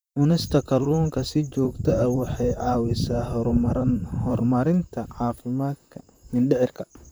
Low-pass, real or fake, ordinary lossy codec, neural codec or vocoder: none; fake; none; vocoder, 44.1 kHz, 128 mel bands, Pupu-Vocoder